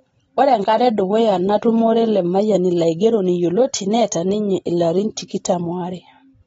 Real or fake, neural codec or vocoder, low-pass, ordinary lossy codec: real; none; 19.8 kHz; AAC, 24 kbps